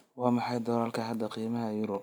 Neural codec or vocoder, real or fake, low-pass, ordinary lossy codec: none; real; none; none